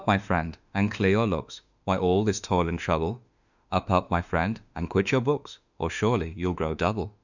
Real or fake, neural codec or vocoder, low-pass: fake; autoencoder, 48 kHz, 32 numbers a frame, DAC-VAE, trained on Japanese speech; 7.2 kHz